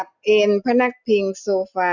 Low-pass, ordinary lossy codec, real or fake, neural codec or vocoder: 7.2 kHz; none; real; none